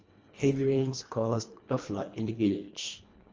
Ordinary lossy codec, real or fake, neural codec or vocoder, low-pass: Opus, 24 kbps; fake; codec, 24 kHz, 1.5 kbps, HILCodec; 7.2 kHz